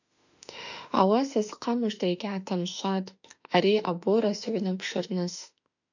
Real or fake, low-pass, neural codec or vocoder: fake; 7.2 kHz; autoencoder, 48 kHz, 32 numbers a frame, DAC-VAE, trained on Japanese speech